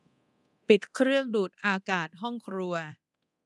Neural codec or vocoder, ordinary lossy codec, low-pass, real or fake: codec, 24 kHz, 0.9 kbps, DualCodec; none; none; fake